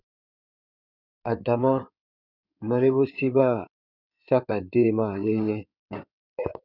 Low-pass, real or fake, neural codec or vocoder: 5.4 kHz; fake; codec, 16 kHz in and 24 kHz out, 2.2 kbps, FireRedTTS-2 codec